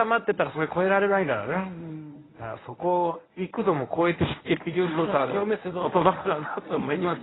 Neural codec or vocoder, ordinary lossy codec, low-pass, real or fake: codec, 24 kHz, 0.9 kbps, WavTokenizer, medium speech release version 1; AAC, 16 kbps; 7.2 kHz; fake